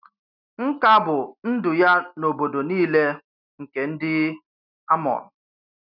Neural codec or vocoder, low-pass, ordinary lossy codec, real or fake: none; 5.4 kHz; none; real